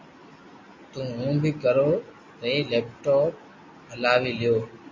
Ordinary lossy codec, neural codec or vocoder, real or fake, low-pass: MP3, 32 kbps; none; real; 7.2 kHz